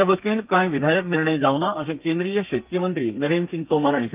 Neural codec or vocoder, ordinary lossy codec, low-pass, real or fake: codec, 32 kHz, 1.9 kbps, SNAC; Opus, 24 kbps; 3.6 kHz; fake